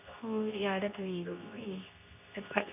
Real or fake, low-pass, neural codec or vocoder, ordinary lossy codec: fake; 3.6 kHz; codec, 24 kHz, 0.9 kbps, WavTokenizer, medium speech release version 2; none